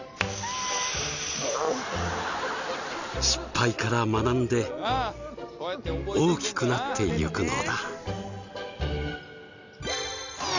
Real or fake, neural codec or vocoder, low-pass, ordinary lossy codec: real; none; 7.2 kHz; none